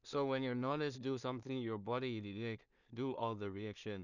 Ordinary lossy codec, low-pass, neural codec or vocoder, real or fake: none; 7.2 kHz; codec, 16 kHz in and 24 kHz out, 0.4 kbps, LongCat-Audio-Codec, two codebook decoder; fake